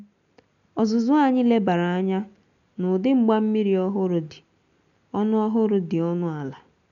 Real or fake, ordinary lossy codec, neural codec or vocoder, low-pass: real; none; none; 7.2 kHz